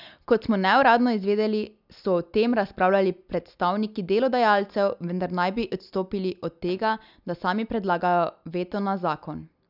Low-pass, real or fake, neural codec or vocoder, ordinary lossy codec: 5.4 kHz; real; none; none